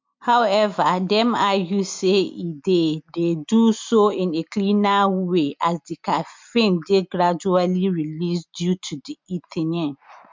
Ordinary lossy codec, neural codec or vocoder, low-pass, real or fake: MP3, 64 kbps; none; 7.2 kHz; real